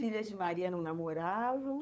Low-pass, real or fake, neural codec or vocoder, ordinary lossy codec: none; fake; codec, 16 kHz, 8 kbps, FunCodec, trained on LibriTTS, 25 frames a second; none